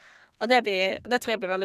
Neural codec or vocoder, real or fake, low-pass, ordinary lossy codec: codec, 32 kHz, 1.9 kbps, SNAC; fake; 14.4 kHz; none